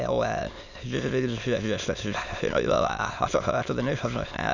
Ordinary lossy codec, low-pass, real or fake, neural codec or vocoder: none; 7.2 kHz; fake; autoencoder, 22.05 kHz, a latent of 192 numbers a frame, VITS, trained on many speakers